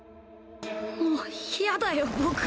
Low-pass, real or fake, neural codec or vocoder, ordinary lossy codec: none; real; none; none